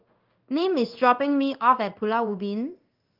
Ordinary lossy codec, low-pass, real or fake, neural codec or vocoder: Opus, 32 kbps; 5.4 kHz; fake; codec, 16 kHz in and 24 kHz out, 1 kbps, XY-Tokenizer